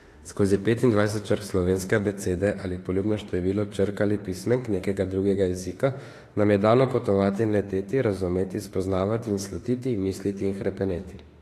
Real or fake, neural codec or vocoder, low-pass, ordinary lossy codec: fake; autoencoder, 48 kHz, 32 numbers a frame, DAC-VAE, trained on Japanese speech; 14.4 kHz; AAC, 48 kbps